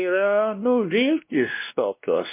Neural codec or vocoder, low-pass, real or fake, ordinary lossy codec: codec, 16 kHz, 1 kbps, X-Codec, WavLM features, trained on Multilingual LibriSpeech; 3.6 kHz; fake; MP3, 24 kbps